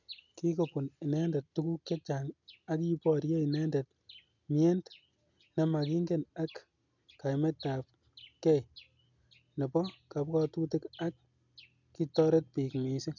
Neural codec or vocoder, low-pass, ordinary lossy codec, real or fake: none; 7.2 kHz; none; real